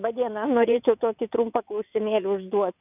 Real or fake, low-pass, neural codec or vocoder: fake; 3.6 kHz; vocoder, 22.05 kHz, 80 mel bands, WaveNeXt